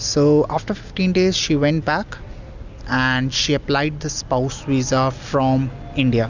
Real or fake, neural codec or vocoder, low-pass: real; none; 7.2 kHz